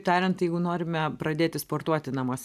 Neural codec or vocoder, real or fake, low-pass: vocoder, 44.1 kHz, 128 mel bands every 512 samples, BigVGAN v2; fake; 14.4 kHz